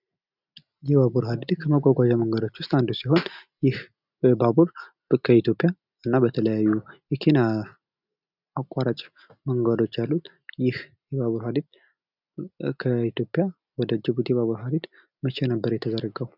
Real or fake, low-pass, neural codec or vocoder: real; 5.4 kHz; none